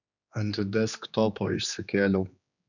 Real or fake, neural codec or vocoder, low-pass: fake; codec, 16 kHz, 2 kbps, X-Codec, HuBERT features, trained on general audio; 7.2 kHz